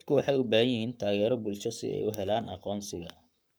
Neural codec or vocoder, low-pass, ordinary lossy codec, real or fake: codec, 44.1 kHz, 7.8 kbps, Pupu-Codec; none; none; fake